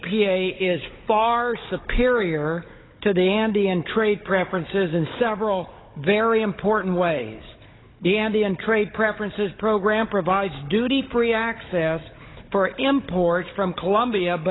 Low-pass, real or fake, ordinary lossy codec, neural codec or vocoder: 7.2 kHz; fake; AAC, 16 kbps; codec, 16 kHz, 16 kbps, FunCodec, trained on Chinese and English, 50 frames a second